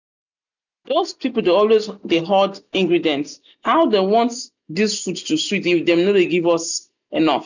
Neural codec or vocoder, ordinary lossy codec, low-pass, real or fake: none; none; 7.2 kHz; real